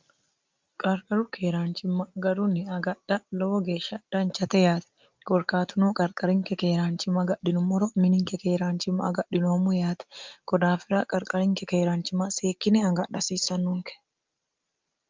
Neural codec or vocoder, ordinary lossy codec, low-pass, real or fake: none; Opus, 32 kbps; 7.2 kHz; real